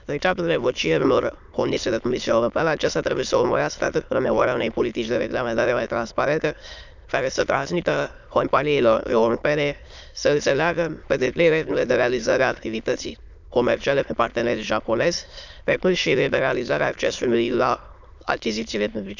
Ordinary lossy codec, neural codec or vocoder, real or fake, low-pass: none; autoencoder, 22.05 kHz, a latent of 192 numbers a frame, VITS, trained on many speakers; fake; 7.2 kHz